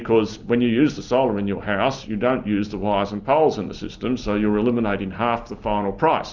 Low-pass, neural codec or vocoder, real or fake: 7.2 kHz; none; real